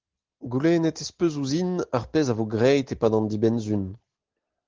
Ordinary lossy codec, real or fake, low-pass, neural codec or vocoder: Opus, 16 kbps; real; 7.2 kHz; none